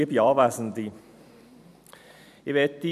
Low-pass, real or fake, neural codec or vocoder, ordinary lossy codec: 14.4 kHz; fake; vocoder, 44.1 kHz, 128 mel bands every 256 samples, BigVGAN v2; none